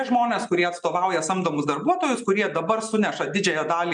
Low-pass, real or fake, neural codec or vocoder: 9.9 kHz; real; none